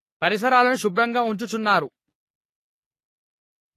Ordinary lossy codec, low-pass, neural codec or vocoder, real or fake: AAC, 48 kbps; 14.4 kHz; codec, 44.1 kHz, 3.4 kbps, Pupu-Codec; fake